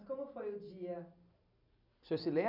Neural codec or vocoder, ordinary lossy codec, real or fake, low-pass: none; none; real; 5.4 kHz